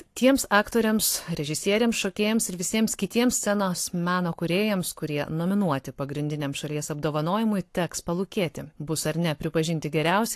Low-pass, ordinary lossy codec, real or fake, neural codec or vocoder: 14.4 kHz; AAC, 64 kbps; fake; codec, 44.1 kHz, 7.8 kbps, Pupu-Codec